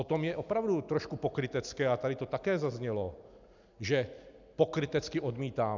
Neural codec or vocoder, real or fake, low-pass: none; real; 7.2 kHz